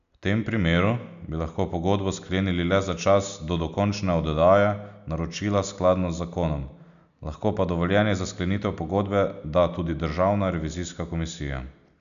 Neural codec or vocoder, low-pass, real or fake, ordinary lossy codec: none; 7.2 kHz; real; none